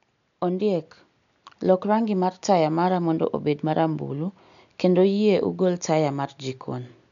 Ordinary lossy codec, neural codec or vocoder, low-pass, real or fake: none; none; 7.2 kHz; real